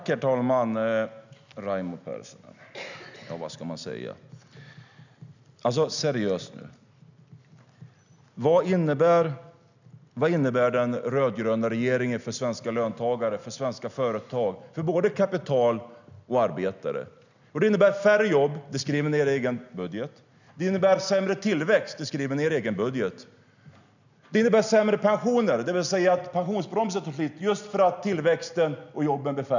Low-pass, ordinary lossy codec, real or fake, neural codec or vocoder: 7.2 kHz; none; real; none